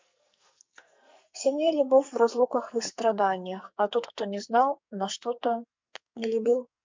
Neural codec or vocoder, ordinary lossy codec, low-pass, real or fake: codec, 44.1 kHz, 2.6 kbps, SNAC; MP3, 64 kbps; 7.2 kHz; fake